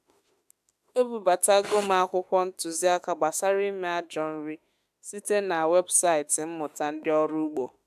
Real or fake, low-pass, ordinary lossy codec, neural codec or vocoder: fake; 14.4 kHz; AAC, 96 kbps; autoencoder, 48 kHz, 32 numbers a frame, DAC-VAE, trained on Japanese speech